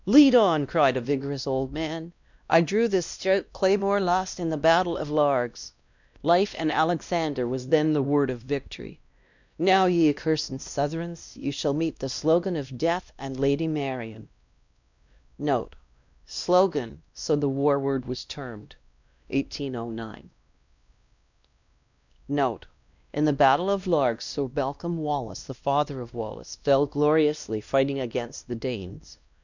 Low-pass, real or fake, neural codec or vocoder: 7.2 kHz; fake; codec, 16 kHz, 1 kbps, X-Codec, WavLM features, trained on Multilingual LibriSpeech